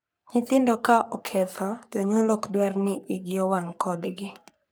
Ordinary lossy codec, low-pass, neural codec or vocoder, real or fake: none; none; codec, 44.1 kHz, 3.4 kbps, Pupu-Codec; fake